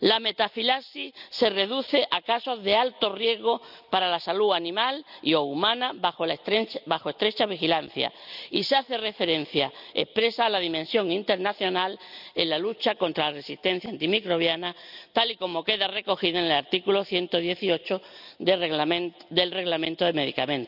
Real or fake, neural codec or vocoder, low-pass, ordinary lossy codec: real; none; 5.4 kHz; none